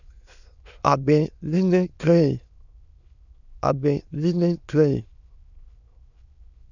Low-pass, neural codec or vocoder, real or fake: 7.2 kHz; autoencoder, 22.05 kHz, a latent of 192 numbers a frame, VITS, trained on many speakers; fake